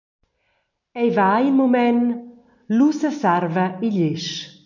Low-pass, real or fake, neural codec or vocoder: 7.2 kHz; real; none